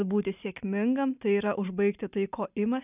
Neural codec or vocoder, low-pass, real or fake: none; 3.6 kHz; real